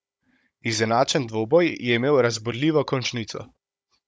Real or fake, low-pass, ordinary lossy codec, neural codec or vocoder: fake; none; none; codec, 16 kHz, 16 kbps, FunCodec, trained on Chinese and English, 50 frames a second